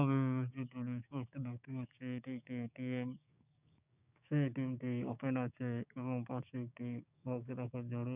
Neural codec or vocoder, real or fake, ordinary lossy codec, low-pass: codec, 16 kHz, 6 kbps, DAC; fake; none; 3.6 kHz